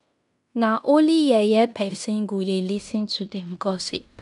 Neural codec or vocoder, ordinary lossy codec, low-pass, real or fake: codec, 16 kHz in and 24 kHz out, 0.9 kbps, LongCat-Audio-Codec, fine tuned four codebook decoder; none; 10.8 kHz; fake